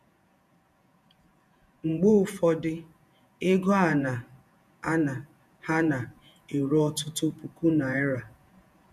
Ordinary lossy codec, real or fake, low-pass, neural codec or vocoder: none; fake; 14.4 kHz; vocoder, 48 kHz, 128 mel bands, Vocos